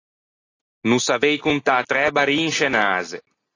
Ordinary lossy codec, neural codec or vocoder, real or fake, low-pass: AAC, 32 kbps; none; real; 7.2 kHz